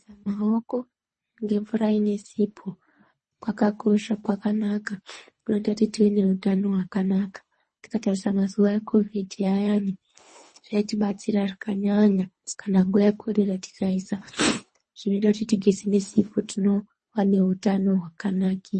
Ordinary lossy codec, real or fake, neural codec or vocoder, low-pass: MP3, 32 kbps; fake; codec, 24 kHz, 3 kbps, HILCodec; 10.8 kHz